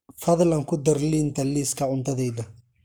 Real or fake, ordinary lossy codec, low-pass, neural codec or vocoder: fake; none; none; codec, 44.1 kHz, 7.8 kbps, Pupu-Codec